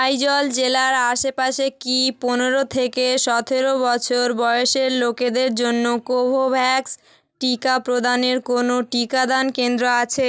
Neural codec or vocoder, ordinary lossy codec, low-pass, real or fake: none; none; none; real